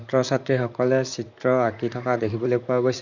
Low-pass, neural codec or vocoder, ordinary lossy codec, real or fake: 7.2 kHz; vocoder, 44.1 kHz, 128 mel bands, Pupu-Vocoder; none; fake